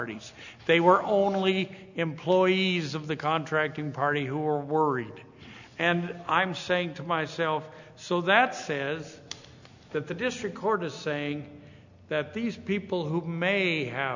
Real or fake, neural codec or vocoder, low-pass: real; none; 7.2 kHz